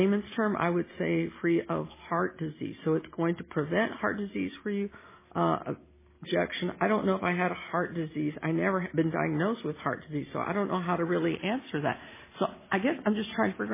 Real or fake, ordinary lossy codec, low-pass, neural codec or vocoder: real; MP3, 16 kbps; 3.6 kHz; none